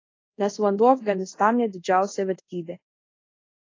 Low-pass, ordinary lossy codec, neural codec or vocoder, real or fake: 7.2 kHz; AAC, 32 kbps; codec, 24 kHz, 0.5 kbps, DualCodec; fake